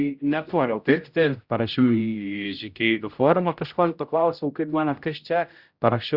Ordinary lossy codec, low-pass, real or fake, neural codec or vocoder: Opus, 64 kbps; 5.4 kHz; fake; codec, 16 kHz, 0.5 kbps, X-Codec, HuBERT features, trained on general audio